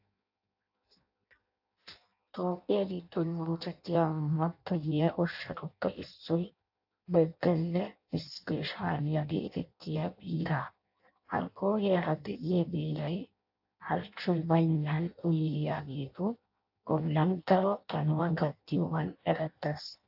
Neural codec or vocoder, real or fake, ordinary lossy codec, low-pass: codec, 16 kHz in and 24 kHz out, 0.6 kbps, FireRedTTS-2 codec; fake; AAC, 48 kbps; 5.4 kHz